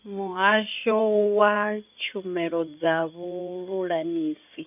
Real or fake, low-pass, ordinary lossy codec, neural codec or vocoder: fake; 3.6 kHz; none; codec, 16 kHz in and 24 kHz out, 2.2 kbps, FireRedTTS-2 codec